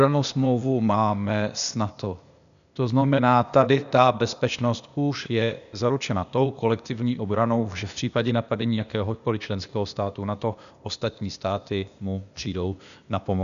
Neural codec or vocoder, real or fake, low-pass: codec, 16 kHz, 0.8 kbps, ZipCodec; fake; 7.2 kHz